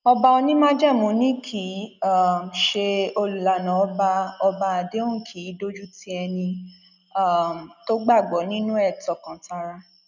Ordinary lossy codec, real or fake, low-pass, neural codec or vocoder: none; real; 7.2 kHz; none